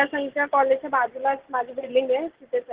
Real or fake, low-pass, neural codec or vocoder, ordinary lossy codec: real; 3.6 kHz; none; Opus, 16 kbps